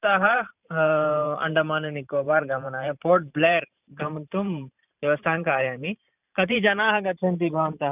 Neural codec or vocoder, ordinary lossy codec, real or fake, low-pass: vocoder, 44.1 kHz, 128 mel bands every 512 samples, BigVGAN v2; none; fake; 3.6 kHz